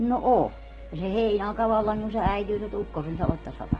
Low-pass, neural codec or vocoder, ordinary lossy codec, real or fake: 9.9 kHz; none; Opus, 16 kbps; real